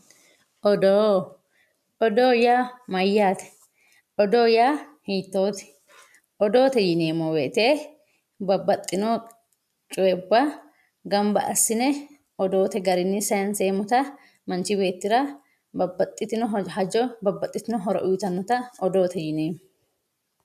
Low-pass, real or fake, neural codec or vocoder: 14.4 kHz; real; none